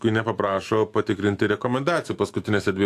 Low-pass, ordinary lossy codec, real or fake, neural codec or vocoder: 14.4 kHz; AAC, 64 kbps; fake; autoencoder, 48 kHz, 128 numbers a frame, DAC-VAE, trained on Japanese speech